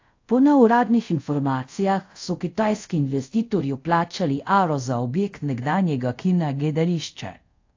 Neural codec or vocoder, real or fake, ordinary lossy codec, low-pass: codec, 24 kHz, 0.5 kbps, DualCodec; fake; AAC, 48 kbps; 7.2 kHz